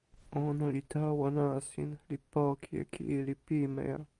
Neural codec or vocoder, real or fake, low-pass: none; real; 10.8 kHz